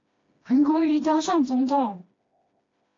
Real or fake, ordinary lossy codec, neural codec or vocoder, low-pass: fake; AAC, 32 kbps; codec, 16 kHz, 1 kbps, FreqCodec, smaller model; 7.2 kHz